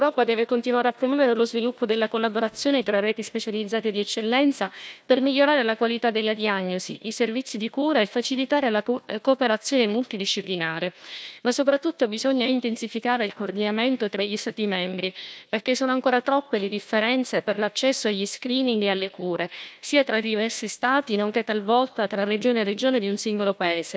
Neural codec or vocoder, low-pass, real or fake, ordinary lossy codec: codec, 16 kHz, 1 kbps, FunCodec, trained on Chinese and English, 50 frames a second; none; fake; none